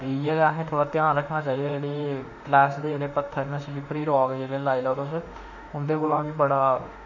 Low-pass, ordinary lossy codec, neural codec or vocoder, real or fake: 7.2 kHz; none; autoencoder, 48 kHz, 32 numbers a frame, DAC-VAE, trained on Japanese speech; fake